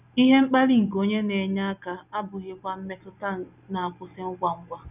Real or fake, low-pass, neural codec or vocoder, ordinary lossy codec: real; 3.6 kHz; none; none